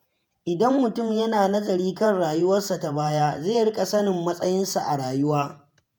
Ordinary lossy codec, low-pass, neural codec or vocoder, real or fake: none; none; vocoder, 48 kHz, 128 mel bands, Vocos; fake